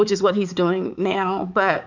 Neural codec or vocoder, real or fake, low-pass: codec, 16 kHz, 4 kbps, X-Codec, HuBERT features, trained on balanced general audio; fake; 7.2 kHz